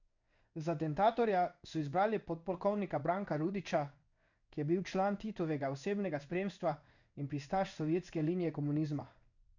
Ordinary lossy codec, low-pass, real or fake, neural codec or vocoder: none; 7.2 kHz; fake; codec, 16 kHz in and 24 kHz out, 1 kbps, XY-Tokenizer